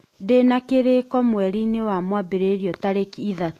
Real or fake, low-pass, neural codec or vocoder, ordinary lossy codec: fake; 14.4 kHz; autoencoder, 48 kHz, 128 numbers a frame, DAC-VAE, trained on Japanese speech; AAC, 48 kbps